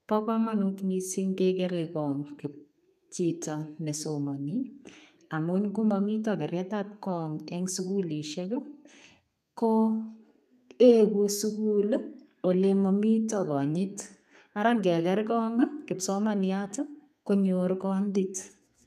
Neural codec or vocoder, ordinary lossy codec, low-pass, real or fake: codec, 32 kHz, 1.9 kbps, SNAC; none; 14.4 kHz; fake